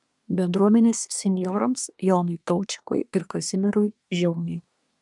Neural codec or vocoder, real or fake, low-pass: codec, 24 kHz, 1 kbps, SNAC; fake; 10.8 kHz